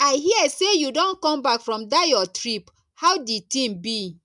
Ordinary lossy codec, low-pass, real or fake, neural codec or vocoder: MP3, 96 kbps; 10.8 kHz; real; none